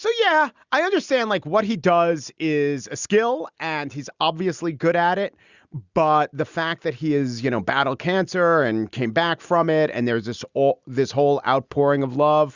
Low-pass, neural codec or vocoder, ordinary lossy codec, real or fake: 7.2 kHz; none; Opus, 64 kbps; real